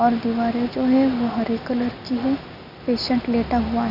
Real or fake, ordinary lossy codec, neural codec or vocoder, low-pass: real; none; none; 5.4 kHz